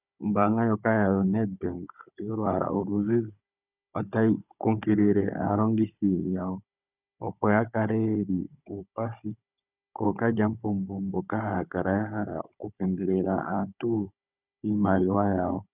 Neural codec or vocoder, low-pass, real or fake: codec, 16 kHz, 16 kbps, FunCodec, trained on Chinese and English, 50 frames a second; 3.6 kHz; fake